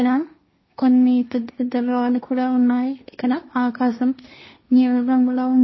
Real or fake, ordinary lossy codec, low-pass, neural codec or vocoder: fake; MP3, 24 kbps; 7.2 kHz; codec, 16 kHz, 1.1 kbps, Voila-Tokenizer